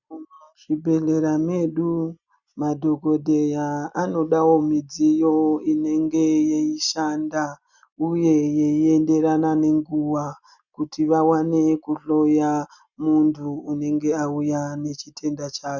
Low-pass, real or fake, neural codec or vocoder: 7.2 kHz; real; none